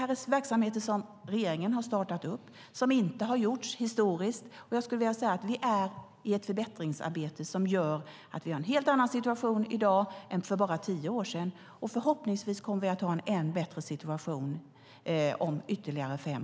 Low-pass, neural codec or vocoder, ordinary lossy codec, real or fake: none; none; none; real